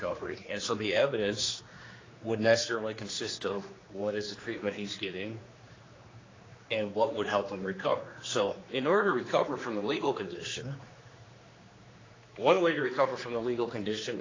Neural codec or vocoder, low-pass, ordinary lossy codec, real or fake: codec, 16 kHz, 2 kbps, X-Codec, HuBERT features, trained on general audio; 7.2 kHz; AAC, 32 kbps; fake